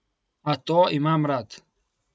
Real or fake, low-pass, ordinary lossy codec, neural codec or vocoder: real; none; none; none